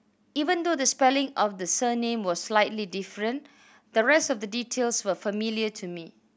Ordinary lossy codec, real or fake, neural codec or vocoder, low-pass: none; real; none; none